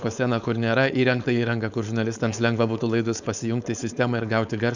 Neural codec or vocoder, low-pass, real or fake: codec, 16 kHz, 4.8 kbps, FACodec; 7.2 kHz; fake